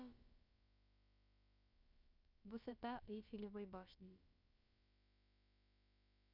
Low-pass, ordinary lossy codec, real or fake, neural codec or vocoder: 5.4 kHz; none; fake; codec, 16 kHz, about 1 kbps, DyCAST, with the encoder's durations